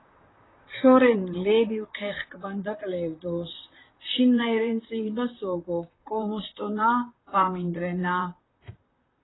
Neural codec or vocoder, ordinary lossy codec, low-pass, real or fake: vocoder, 44.1 kHz, 128 mel bands, Pupu-Vocoder; AAC, 16 kbps; 7.2 kHz; fake